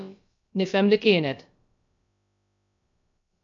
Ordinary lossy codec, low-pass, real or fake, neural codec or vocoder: MP3, 64 kbps; 7.2 kHz; fake; codec, 16 kHz, about 1 kbps, DyCAST, with the encoder's durations